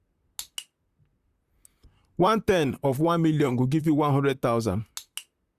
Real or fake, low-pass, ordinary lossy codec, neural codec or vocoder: fake; 14.4 kHz; Opus, 64 kbps; vocoder, 44.1 kHz, 128 mel bands, Pupu-Vocoder